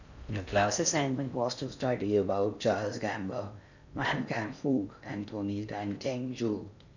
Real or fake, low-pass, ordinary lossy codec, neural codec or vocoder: fake; 7.2 kHz; none; codec, 16 kHz in and 24 kHz out, 0.6 kbps, FocalCodec, streaming, 4096 codes